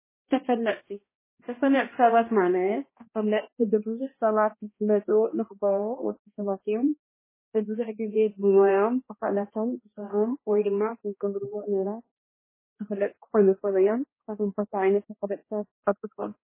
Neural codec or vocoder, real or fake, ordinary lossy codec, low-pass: codec, 16 kHz, 1 kbps, X-Codec, HuBERT features, trained on balanced general audio; fake; MP3, 16 kbps; 3.6 kHz